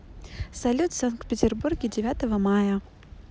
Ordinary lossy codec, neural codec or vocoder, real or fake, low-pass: none; none; real; none